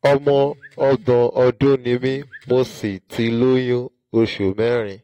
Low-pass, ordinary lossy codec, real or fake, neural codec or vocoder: 19.8 kHz; AAC, 48 kbps; real; none